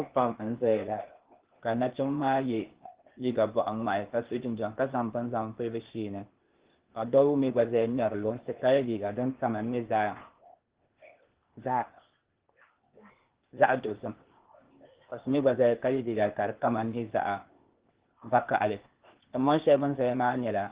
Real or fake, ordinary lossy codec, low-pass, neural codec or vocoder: fake; Opus, 16 kbps; 3.6 kHz; codec, 16 kHz, 0.8 kbps, ZipCodec